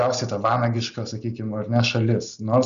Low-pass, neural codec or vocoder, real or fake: 7.2 kHz; none; real